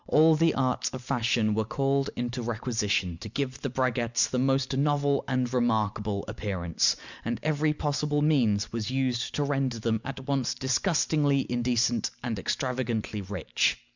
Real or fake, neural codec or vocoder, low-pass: real; none; 7.2 kHz